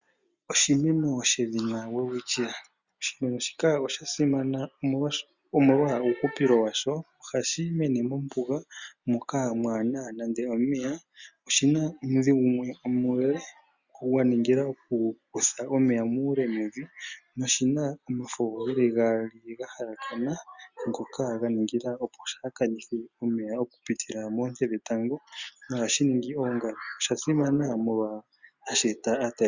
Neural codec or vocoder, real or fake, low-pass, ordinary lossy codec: none; real; 7.2 kHz; Opus, 64 kbps